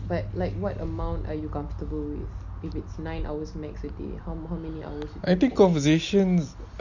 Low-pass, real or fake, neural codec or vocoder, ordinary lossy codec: 7.2 kHz; real; none; none